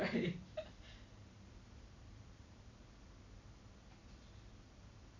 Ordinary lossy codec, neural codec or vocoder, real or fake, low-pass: none; vocoder, 44.1 kHz, 128 mel bands every 256 samples, BigVGAN v2; fake; 7.2 kHz